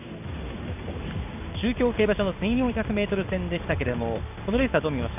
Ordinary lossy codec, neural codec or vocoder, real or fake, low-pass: none; codec, 16 kHz in and 24 kHz out, 1 kbps, XY-Tokenizer; fake; 3.6 kHz